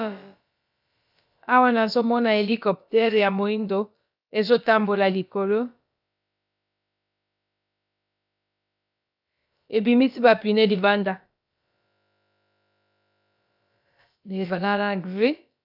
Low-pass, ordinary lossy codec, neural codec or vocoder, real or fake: 5.4 kHz; AAC, 48 kbps; codec, 16 kHz, about 1 kbps, DyCAST, with the encoder's durations; fake